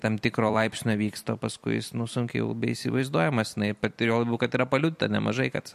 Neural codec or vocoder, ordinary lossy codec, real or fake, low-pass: vocoder, 44.1 kHz, 128 mel bands every 256 samples, BigVGAN v2; MP3, 64 kbps; fake; 14.4 kHz